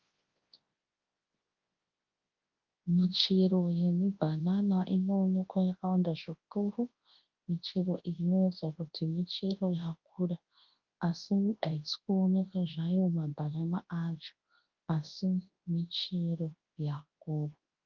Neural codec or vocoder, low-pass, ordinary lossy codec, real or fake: codec, 24 kHz, 0.9 kbps, WavTokenizer, large speech release; 7.2 kHz; Opus, 32 kbps; fake